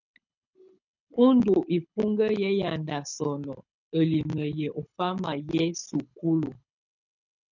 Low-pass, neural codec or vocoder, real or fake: 7.2 kHz; codec, 24 kHz, 6 kbps, HILCodec; fake